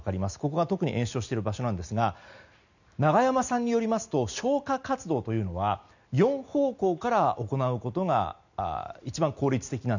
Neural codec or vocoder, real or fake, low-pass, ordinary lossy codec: none; real; 7.2 kHz; none